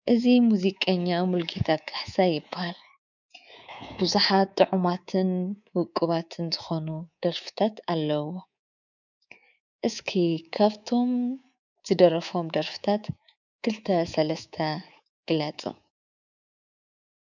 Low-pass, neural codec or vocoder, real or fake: 7.2 kHz; codec, 24 kHz, 3.1 kbps, DualCodec; fake